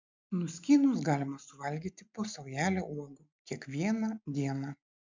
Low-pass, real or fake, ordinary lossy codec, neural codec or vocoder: 7.2 kHz; real; MP3, 64 kbps; none